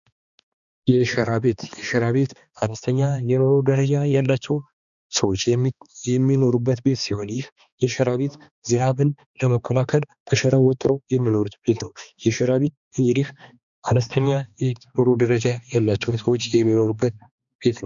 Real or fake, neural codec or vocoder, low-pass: fake; codec, 16 kHz, 2 kbps, X-Codec, HuBERT features, trained on balanced general audio; 7.2 kHz